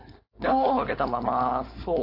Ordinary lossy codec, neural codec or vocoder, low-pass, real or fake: AAC, 32 kbps; codec, 16 kHz, 4.8 kbps, FACodec; 5.4 kHz; fake